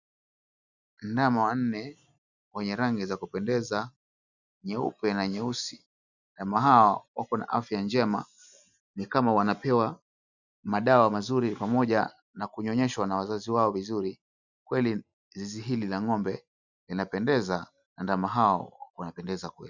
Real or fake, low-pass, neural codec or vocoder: real; 7.2 kHz; none